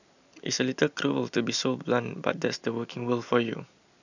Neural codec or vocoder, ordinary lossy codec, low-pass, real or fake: none; none; 7.2 kHz; real